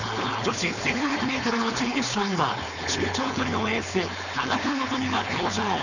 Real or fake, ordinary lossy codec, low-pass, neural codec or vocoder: fake; none; 7.2 kHz; codec, 16 kHz, 4.8 kbps, FACodec